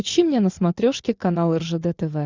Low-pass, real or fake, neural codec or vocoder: 7.2 kHz; real; none